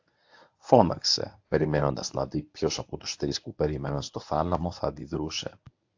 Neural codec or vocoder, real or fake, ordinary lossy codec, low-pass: codec, 24 kHz, 0.9 kbps, WavTokenizer, medium speech release version 1; fake; AAC, 48 kbps; 7.2 kHz